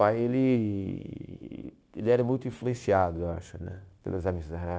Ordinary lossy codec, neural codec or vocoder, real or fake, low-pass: none; codec, 16 kHz, 0.9 kbps, LongCat-Audio-Codec; fake; none